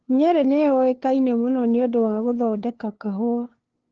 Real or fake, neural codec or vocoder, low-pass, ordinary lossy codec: fake; codec, 16 kHz, 2 kbps, FunCodec, trained on LibriTTS, 25 frames a second; 7.2 kHz; Opus, 16 kbps